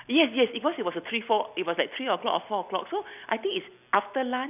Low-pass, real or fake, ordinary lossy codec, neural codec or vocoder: 3.6 kHz; real; none; none